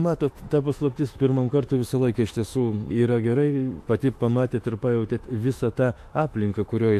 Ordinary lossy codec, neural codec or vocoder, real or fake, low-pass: AAC, 64 kbps; autoencoder, 48 kHz, 32 numbers a frame, DAC-VAE, trained on Japanese speech; fake; 14.4 kHz